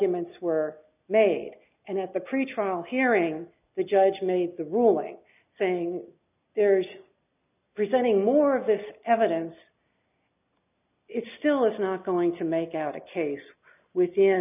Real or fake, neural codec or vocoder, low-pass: real; none; 3.6 kHz